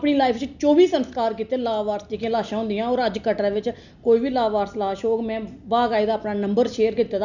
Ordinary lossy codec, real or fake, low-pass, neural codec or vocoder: none; real; 7.2 kHz; none